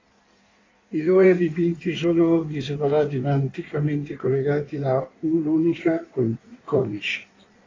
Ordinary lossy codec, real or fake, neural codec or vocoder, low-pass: AAC, 32 kbps; fake; codec, 16 kHz in and 24 kHz out, 1.1 kbps, FireRedTTS-2 codec; 7.2 kHz